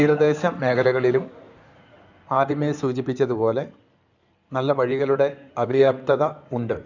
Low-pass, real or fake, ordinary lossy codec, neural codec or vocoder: 7.2 kHz; fake; none; codec, 16 kHz in and 24 kHz out, 2.2 kbps, FireRedTTS-2 codec